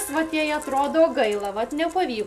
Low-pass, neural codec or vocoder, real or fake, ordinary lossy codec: 14.4 kHz; none; real; MP3, 96 kbps